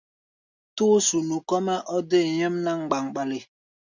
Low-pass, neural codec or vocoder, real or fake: 7.2 kHz; none; real